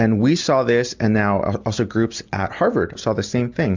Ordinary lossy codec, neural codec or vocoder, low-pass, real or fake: MP3, 64 kbps; none; 7.2 kHz; real